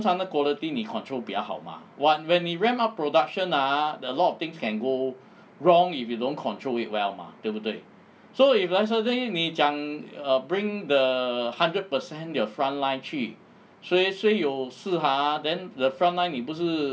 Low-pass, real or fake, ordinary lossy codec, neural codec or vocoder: none; real; none; none